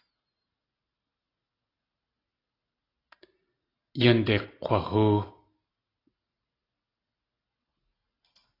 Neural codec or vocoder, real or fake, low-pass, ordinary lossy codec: none; real; 5.4 kHz; AAC, 24 kbps